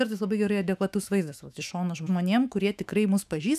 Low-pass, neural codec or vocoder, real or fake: 14.4 kHz; autoencoder, 48 kHz, 128 numbers a frame, DAC-VAE, trained on Japanese speech; fake